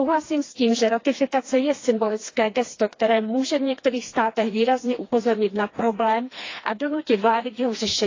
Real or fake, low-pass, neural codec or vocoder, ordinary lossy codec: fake; 7.2 kHz; codec, 16 kHz, 2 kbps, FreqCodec, smaller model; AAC, 32 kbps